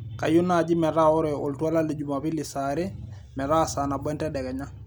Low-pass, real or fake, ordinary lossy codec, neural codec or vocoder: none; real; none; none